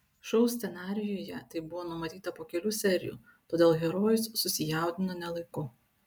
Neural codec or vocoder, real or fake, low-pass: none; real; 19.8 kHz